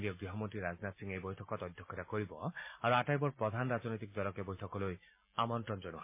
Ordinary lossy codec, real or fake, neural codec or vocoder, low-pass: MP3, 24 kbps; real; none; 3.6 kHz